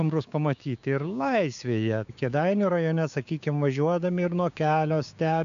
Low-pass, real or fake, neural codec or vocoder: 7.2 kHz; fake; codec, 16 kHz, 6 kbps, DAC